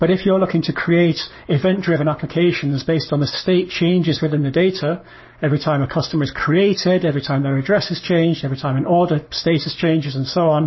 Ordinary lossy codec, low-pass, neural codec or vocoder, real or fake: MP3, 24 kbps; 7.2 kHz; codec, 44.1 kHz, 7.8 kbps, Pupu-Codec; fake